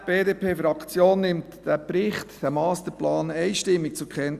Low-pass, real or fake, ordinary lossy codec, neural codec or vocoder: 14.4 kHz; real; Opus, 64 kbps; none